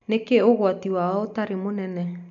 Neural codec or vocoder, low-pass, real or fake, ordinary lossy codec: none; 7.2 kHz; real; none